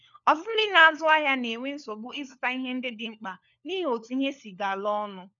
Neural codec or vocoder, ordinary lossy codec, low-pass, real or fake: codec, 16 kHz, 4 kbps, FunCodec, trained on LibriTTS, 50 frames a second; none; 7.2 kHz; fake